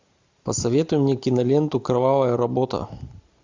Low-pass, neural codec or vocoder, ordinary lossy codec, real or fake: 7.2 kHz; none; MP3, 64 kbps; real